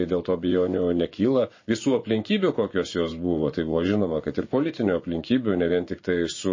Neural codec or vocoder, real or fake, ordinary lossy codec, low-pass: vocoder, 24 kHz, 100 mel bands, Vocos; fake; MP3, 32 kbps; 7.2 kHz